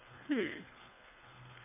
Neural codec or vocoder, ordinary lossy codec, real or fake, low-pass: codec, 24 kHz, 3 kbps, HILCodec; none; fake; 3.6 kHz